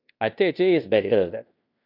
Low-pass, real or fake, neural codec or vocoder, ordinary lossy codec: 5.4 kHz; fake; codec, 16 kHz in and 24 kHz out, 0.9 kbps, LongCat-Audio-Codec, fine tuned four codebook decoder; none